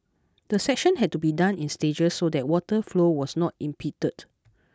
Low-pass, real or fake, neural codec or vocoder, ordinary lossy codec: none; real; none; none